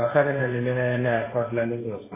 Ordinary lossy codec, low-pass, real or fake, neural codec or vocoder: MP3, 16 kbps; 3.6 kHz; fake; codec, 16 kHz, 1.1 kbps, Voila-Tokenizer